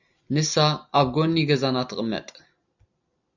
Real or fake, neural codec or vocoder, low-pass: real; none; 7.2 kHz